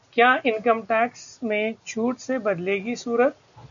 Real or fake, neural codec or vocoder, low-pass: real; none; 7.2 kHz